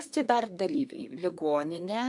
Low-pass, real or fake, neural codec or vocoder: 10.8 kHz; fake; codec, 24 kHz, 1 kbps, SNAC